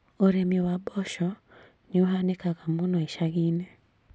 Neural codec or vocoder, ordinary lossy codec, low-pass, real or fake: none; none; none; real